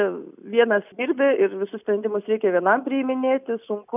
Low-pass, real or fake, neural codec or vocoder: 3.6 kHz; real; none